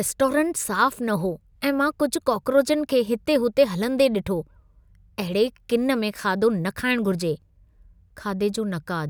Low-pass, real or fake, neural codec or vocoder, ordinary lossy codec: none; real; none; none